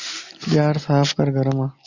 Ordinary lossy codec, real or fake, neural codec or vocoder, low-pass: Opus, 64 kbps; real; none; 7.2 kHz